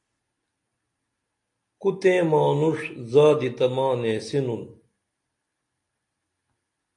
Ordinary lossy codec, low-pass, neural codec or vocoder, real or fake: AAC, 48 kbps; 10.8 kHz; none; real